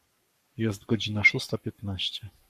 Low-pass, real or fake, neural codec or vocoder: 14.4 kHz; fake; codec, 44.1 kHz, 7.8 kbps, Pupu-Codec